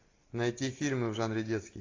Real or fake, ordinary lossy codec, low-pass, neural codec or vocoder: real; AAC, 32 kbps; 7.2 kHz; none